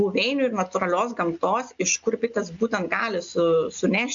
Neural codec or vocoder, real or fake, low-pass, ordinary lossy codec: none; real; 7.2 kHz; MP3, 64 kbps